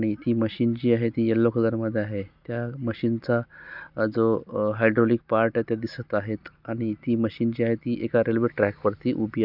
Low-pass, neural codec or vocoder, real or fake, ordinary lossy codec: 5.4 kHz; none; real; none